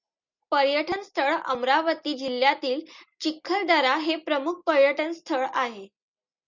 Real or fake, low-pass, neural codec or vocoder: real; 7.2 kHz; none